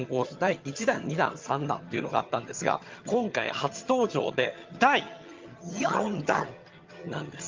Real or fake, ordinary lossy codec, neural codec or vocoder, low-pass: fake; Opus, 32 kbps; vocoder, 22.05 kHz, 80 mel bands, HiFi-GAN; 7.2 kHz